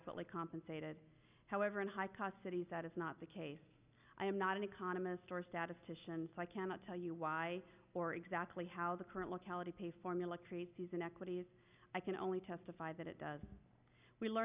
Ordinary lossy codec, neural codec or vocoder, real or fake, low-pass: Opus, 64 kbps; none; real; 3.6 kHz